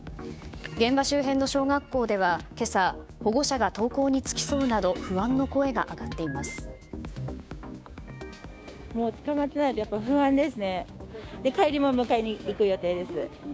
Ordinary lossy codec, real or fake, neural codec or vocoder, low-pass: none; fake; codec, 16 kHz, 6 kbps, DAC; none